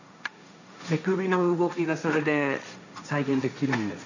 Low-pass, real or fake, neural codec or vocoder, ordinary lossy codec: 7.2 kHz; fake; codec, 16 kHz, 1.1 kbps, Voila-Tokenizer; none